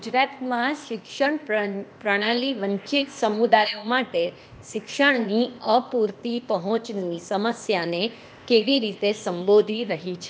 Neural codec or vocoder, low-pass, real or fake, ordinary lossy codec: codec, 16 kHz, 0.8 kbps, ZipCodec; none; fake; none